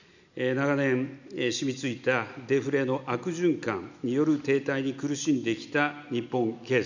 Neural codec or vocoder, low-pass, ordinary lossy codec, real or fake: none; 7.2 kHz; none; real